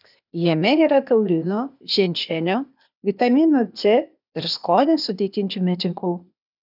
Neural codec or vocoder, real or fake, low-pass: codec, 16 kHz, 0.8 kbps, ZipCodec; fake; 5.4 kHz